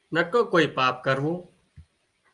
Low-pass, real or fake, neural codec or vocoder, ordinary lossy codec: 10.8 kHz; real; none; Opus, 32 kbps